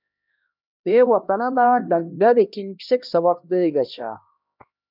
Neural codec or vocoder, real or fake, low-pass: codec, 16 kHz, 1 kbps, X-Codec, HuBERT features, trained on LibriSpeech; fake; 5.4 kHz